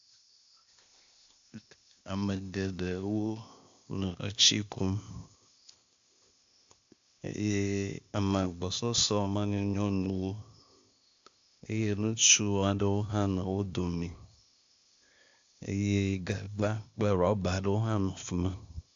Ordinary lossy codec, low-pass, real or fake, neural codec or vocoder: AAC, 64 kbps; 7.2 kHz; fake; codec, 16 kHz, 0.8 kbps, ZipCodec